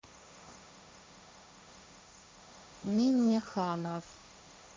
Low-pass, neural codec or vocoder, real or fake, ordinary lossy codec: none; codec, 16 kHz, 1.1 kbps, Voila-Tokenizer; fake; none